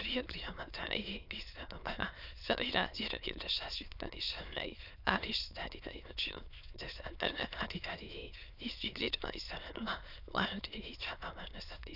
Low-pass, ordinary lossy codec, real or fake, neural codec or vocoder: 5.4 kHz; none; fake; autoencoder, 22.05 kHz, a latent of 192 numbers a frame, VITS, trained on many speakers